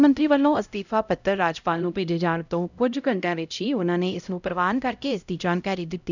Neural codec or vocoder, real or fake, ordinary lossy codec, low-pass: codec, 16 kHz, 0.5 kbps, X-Codec, HuBERT features, trained on LibriSpeech; fake; none; 7.2 kHz